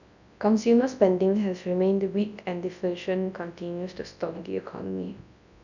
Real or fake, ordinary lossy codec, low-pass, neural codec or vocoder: fake; none; 7.2 kHz; codec, 24 kHz, 0.9 kbps, WavTokenizer, large speech release